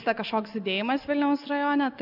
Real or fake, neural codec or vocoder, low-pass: real; none; 5.4 kHz